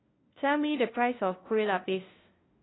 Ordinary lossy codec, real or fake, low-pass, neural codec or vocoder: AAC, 16 kbps; fake; 7.2 kHz; codec, 16 kHz, 0.5 kbps, FunCodec, trained on LibriTTS, 25 frames a second